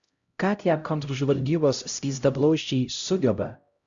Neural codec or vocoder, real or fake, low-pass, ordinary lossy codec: codec, 16 kHz, 0.5 kbps, X-Codec, HuBERT features, trained on LibriSpeech; fake; 7.2 kHz; Opus, 64 kbps